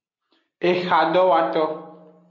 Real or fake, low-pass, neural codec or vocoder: real; 7.2 kHz; none